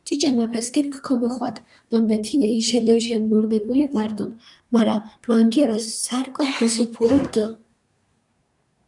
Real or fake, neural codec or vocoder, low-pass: fake; codec, 24 kHz, 1 kbps, SNAC; 10.8 kHz